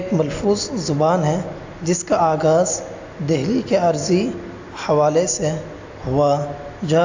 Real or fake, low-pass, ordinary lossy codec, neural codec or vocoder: real; 7.2 kHz; AAC, 48 kbps; none